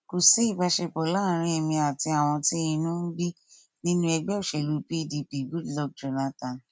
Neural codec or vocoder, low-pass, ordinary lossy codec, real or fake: none; none; none; real